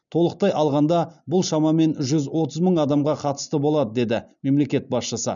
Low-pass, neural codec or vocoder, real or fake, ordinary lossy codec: 7.2 kHz; none; real; none